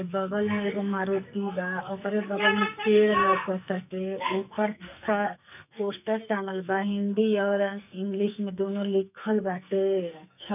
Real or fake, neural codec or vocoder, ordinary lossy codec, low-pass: fake; codec, 44.1 kHz, 2.6 kbps, SNAC; none; 3.6 kHz